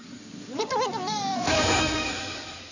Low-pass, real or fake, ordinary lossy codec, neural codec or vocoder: 7.2 kHz; fake; none; codec, 16 kHz in and 24 kHz out, 2.2 kbps, FireRedTTS-2 codec